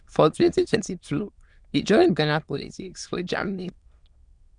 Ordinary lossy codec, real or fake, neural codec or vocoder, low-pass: Opus, 64 kbps; fake; autoencoder, 22.05 kHz, a latent of 192 numbers a frame, VITS, trained on many speakers; 9.9 kHz